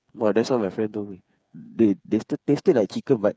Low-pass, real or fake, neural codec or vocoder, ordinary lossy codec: none; fake; codec, 16 kHz, 8 kbps, FreqCodec, smaller model; none